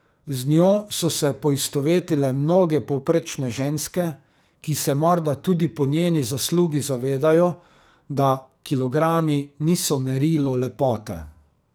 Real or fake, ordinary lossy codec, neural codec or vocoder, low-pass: fake; none; codec, 44.1 kHz, 2.6 kbps, SNAC; none